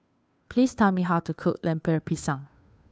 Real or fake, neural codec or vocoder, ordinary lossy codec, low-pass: fake; codec, 16 kHz, 2 kbps, FunCodec, trained on Chinese and English, 25 frames a second; none; none